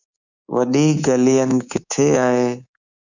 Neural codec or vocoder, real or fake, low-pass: codec, 24 kHz, 3.1 kbps, DualCodec; fake; 7.2 kHz